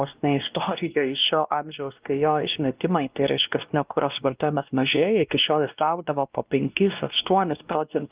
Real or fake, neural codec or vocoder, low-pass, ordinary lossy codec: fake; codec, 16 kHz, 1 kbps, X-Codec, WavLM features, trained on Multilingual LibriSpeech; 3.6 kHz; Opus, 32 kbps